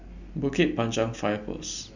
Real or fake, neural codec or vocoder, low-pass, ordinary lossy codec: real; none; 7.2 kHz; none